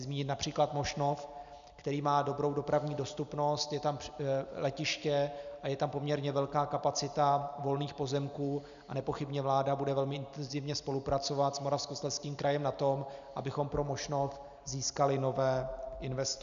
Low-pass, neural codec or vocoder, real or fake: 7.2 kHz; none; real